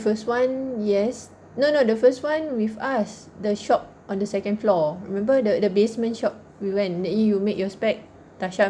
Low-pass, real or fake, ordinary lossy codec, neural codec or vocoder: 9.9 kHz; real; none; none